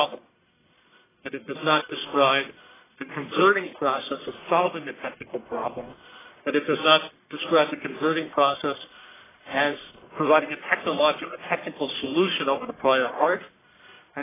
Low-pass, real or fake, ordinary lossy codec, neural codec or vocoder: 3.6 kHz; fake; AAC, 16 kbps; codec, 44.1 kHz, 1.7 kbps, Pupu-Codec